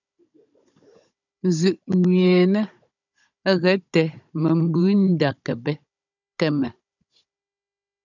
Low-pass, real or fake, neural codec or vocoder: 7.2 kHz; fake; codec, 16 kHz, 16 kbps, FunCodec, trained on Chinese and English, 50 frames a second